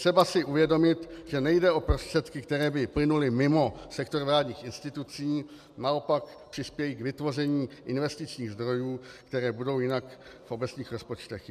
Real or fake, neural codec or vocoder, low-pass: real; none; 14.4 kHz